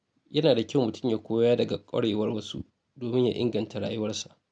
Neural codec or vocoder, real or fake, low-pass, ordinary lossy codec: none; real; 9.9 kHz; none